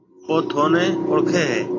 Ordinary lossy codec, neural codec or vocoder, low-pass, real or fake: AAC, 32 kbps; none; 7.2 kHz; real